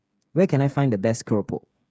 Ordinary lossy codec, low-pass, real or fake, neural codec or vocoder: none; none; fake; codec, 16 kHz, 8 kbps, FreqCodec, smaller model